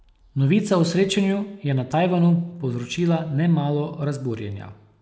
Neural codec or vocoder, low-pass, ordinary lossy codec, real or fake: none; none; none; real